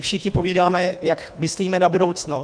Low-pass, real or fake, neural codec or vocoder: 9.9 kHz; fake; codec, 24 kHz, 1.5 kbps, HILCodec